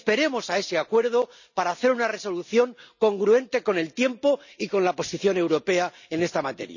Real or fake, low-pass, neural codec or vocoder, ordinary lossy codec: real; 7.2 kHz; none; none